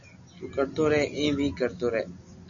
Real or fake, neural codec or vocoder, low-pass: real; none; 7.2 kHz